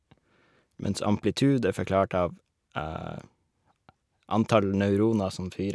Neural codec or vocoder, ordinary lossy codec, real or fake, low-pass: none; none; real; none